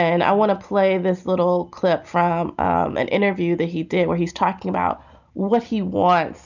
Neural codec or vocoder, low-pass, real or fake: none; 7.2 kHz; real